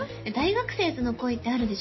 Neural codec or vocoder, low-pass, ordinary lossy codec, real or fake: none; 7.2 kHz; MP3, 24 kbps; real